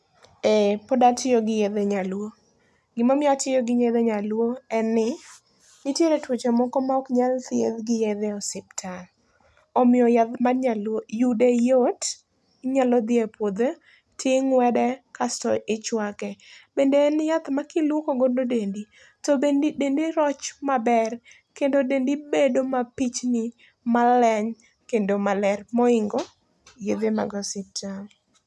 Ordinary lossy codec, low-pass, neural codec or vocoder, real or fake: none; none; none; real